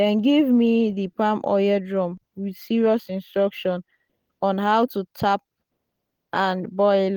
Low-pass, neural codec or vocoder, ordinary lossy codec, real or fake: 19.8 kHz; none; Opus, 24 kbps; real